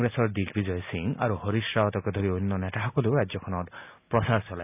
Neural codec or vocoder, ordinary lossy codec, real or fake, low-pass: none; none; real; 3.6 kHz